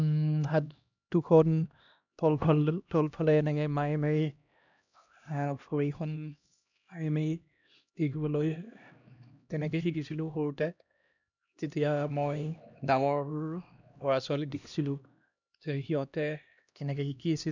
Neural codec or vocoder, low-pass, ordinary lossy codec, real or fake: codec, 16 kHz, 1 kbps, X-Codec, HuBERT features, trained on LibriSpeech; 7.2 kHz; none; fake